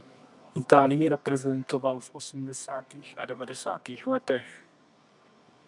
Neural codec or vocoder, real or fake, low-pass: codec, 24 kHz, 0.9 kbps, WavTokenizer, medium music audio release; fake; 10.8 kHz